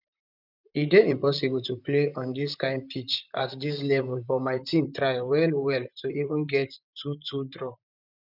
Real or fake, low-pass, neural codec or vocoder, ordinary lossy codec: fake; 5.4 kHz; vocoder, 44.1 kHz, 128 mel bands, Pupu-Vocoder; none